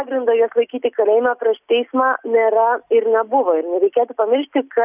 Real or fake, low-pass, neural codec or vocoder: real; 3.6 kHz; none